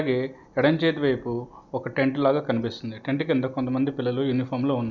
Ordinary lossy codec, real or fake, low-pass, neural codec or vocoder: none; real; 7.2 kHz; none